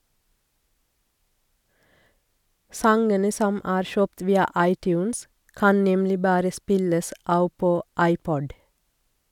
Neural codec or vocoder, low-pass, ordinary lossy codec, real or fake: none; 19.8 kHz; none; real